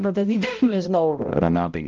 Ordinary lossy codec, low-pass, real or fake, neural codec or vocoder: Opus, 32 kbps; 7.2 kHz; fake; codec, 16 kHz, 0.5 kbps, X-Codec, HuBERT features, trained on balanced general audio